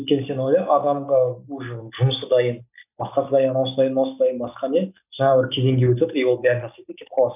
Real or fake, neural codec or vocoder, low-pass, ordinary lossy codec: real; none; 3.6 kHz; none